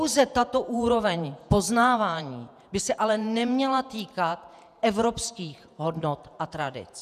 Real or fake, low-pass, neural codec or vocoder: fake; 14.4 kHz; vocoder, 48 kHz, 128 mel bands, Vocos